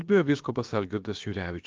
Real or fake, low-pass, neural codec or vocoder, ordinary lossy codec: fake; 7.2 kHz; codec, 16 kHz, about 1 kbps, DyCAST, with the encoder's durations; Opus, 32 kbps